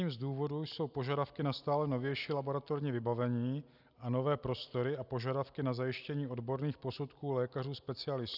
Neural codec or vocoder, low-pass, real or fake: none; 5.4 kHz; real